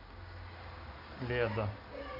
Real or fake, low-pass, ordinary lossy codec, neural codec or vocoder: real; 5.4 kHz; none; none